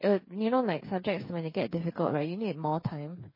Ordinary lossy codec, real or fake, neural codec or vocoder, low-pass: MP3, 24 kbps; fake; codec, 16 kHz, 8 kbps, FreqCodec, smaller model; 5.4 kHz